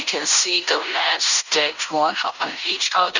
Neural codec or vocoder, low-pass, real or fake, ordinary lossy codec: codec, 16 kHz in and 24 kHz out, 0.9 kbps, LongCat-Audio-Codec, fine tuned four codebook decoder; 7.2 kHz; fake; none